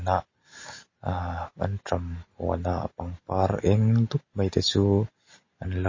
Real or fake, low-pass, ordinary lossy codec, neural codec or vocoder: real; 7.2 kHz; MP3, 32 kbps; none